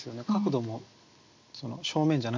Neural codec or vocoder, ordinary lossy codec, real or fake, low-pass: none; MP3, 64 kbps; real; 7.2 kHz